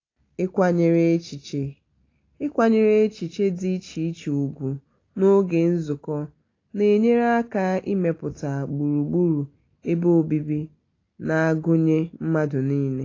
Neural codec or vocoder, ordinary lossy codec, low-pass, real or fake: none; AAC, 32 kbps; 7.2 kHz; real